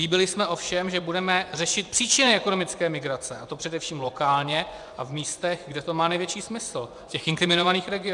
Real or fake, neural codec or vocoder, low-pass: fake; vocoder, 24 kHz, 100 mel bands, Vocos; 10.8 kHz